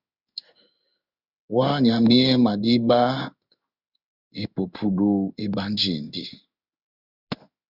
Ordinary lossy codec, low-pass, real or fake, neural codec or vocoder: Opus, 64 kbps; 5.4 kHz; fake; codec, 16 kHz in and 24 kHz out, 1 kbps, XY-Tokenizer